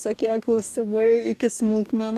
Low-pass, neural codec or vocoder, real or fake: 14.4 kHz; codec, 44.1 kHz, 2.6 kbps, DAC; fake